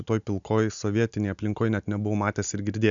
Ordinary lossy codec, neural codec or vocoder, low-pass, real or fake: MP3, 96 kbps; none; 7.2 kHz; real